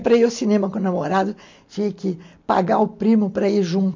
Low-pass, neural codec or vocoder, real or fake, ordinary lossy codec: 7.2 kHz; none; real; MP3, 48 kbps